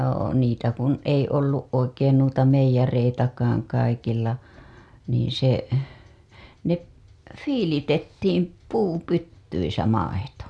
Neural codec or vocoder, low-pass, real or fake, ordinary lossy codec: none; none; real; none